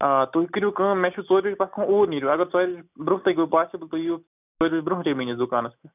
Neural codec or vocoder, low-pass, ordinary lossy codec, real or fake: none; 3.6 kHz; none; real